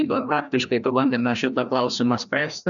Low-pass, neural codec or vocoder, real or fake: 7.2 kHz; codec, 16 kHz, 1 kbps, FreqCodec, larger model; fake